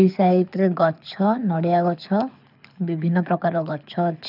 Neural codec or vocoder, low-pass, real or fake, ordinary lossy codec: codec, 24 kHz, 6 kbps, HILCodec; 5.4 kHz; fake; none